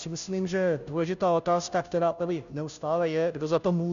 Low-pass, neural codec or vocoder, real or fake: 7.2 kHz; codec, 16 kHz, 0.5 kbps, FunCodec, trained on Chinese and English, 25 frames a second; fake